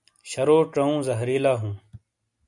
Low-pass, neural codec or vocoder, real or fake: 10.8 kHz; none; real